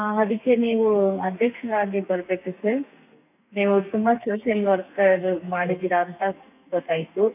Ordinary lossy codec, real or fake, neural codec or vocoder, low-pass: none; fake; codec, 32 kHz, 1.9 kbps, SNAC; 3.6 kHz